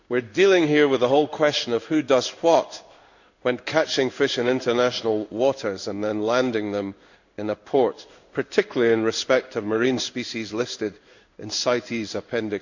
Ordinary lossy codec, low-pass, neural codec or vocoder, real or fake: none; 7.2 kHz; codec, 16 kHz in and 24 kHz out, 1 kbps, XY-Tokenizer; fake